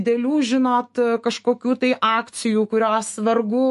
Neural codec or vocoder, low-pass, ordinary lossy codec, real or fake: autoencoder, 48 kHz, 128 numbers a frame, DAC-VAE, trained on Japanese speech; 14.4 kHz; MP3, 48 kbps; fake